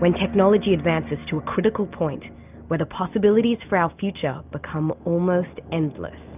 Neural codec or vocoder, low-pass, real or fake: none; 3.6 kHz; real